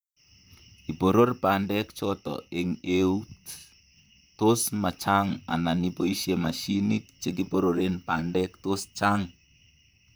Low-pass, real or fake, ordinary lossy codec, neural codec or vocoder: none; fake; none; vocoder, 44.1 kHz, 128 mel bands, Pupu-Vocoder